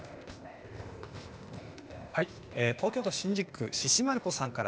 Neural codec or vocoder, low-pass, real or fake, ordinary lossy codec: codec, 16 kHz, 0.8 kbps, ZipCodec; none; fake; none